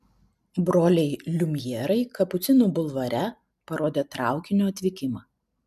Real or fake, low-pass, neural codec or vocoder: real; 14.4 kHz; none